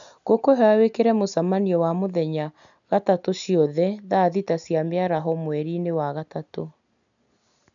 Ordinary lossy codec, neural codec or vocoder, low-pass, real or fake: none; none; 7.2 kHz; real